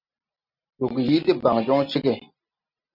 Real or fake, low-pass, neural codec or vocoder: real; 5.4 kHz; none